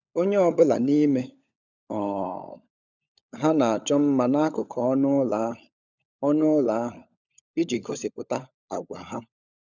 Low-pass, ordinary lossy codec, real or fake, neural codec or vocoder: 7.2 kHz; none; fake; codec, 16 kHz, 16 kbps, FunCodec, trained on LibriTTS, 50 frames a second